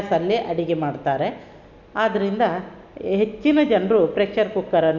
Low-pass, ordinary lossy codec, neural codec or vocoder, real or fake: 7.2 kHz; none; none; real